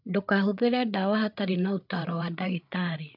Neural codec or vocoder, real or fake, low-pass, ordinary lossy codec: codec, 16 kHz, 8 kbps, FreqCodec, larger model; fake; 5.4 kHz; none